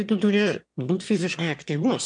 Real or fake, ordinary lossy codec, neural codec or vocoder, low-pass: fake; MP3, 64 kbps; autoencoder, 22.05 kHz, a latent of 192 numbers a frame, VITS, trained on one speaker; 9.9 kHz